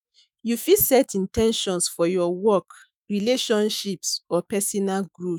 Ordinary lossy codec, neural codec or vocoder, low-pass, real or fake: none; autoencoder, 48 kHz, 128 numbers a frame, DAC-VAE, trained on Japanese speech; none; fake